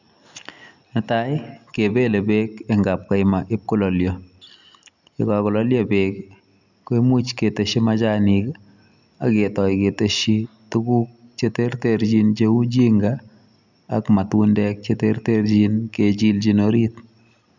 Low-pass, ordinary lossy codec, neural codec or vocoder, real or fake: 7.2 kHz; none; none; real